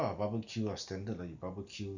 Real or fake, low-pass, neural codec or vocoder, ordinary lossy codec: real; 7.2 kHz; none; none